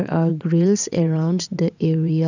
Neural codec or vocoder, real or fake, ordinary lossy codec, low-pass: vocoder, 22.05 kHz, 80 mel bands, WaveNeXt; fake; none; 7.2 kHz